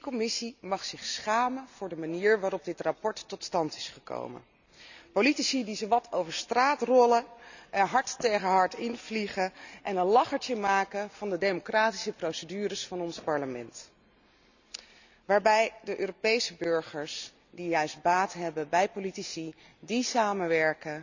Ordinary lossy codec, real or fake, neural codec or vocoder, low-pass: none; real; none; 7.2 kHz